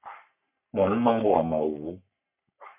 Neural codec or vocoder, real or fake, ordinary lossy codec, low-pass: codec, 44.1 kHz, 3.4 kbps, Pupu-Codec; fake; MP3, 32 kbps; 3.6 kHz